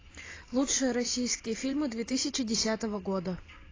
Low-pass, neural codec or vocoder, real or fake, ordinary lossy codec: 7.2 kHz; vocoder, 44.1 kHz, 128 mel bands every 512 samples, BigVGAN v2; fake; AAC, 32 kbps